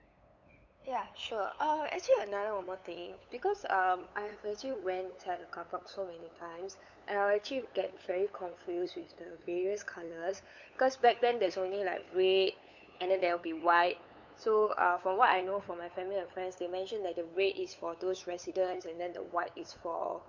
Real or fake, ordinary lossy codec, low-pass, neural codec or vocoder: fake; none; 7.2 kHz; codec, 16 kHz, 8 kbps, FunCodec, trained on LibriTTS, 25 frames a second